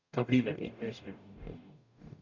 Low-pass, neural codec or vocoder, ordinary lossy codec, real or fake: 7.2 kHz; codec, 44.1 kHz, 0.9 kbps, DAC; none; fake